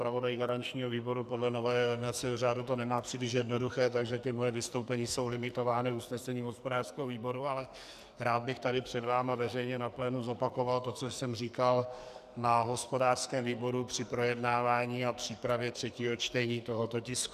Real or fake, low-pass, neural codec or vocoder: fake; 14.4 kHz; codec, 44.1 kHz, 2.6 kbps, SNAC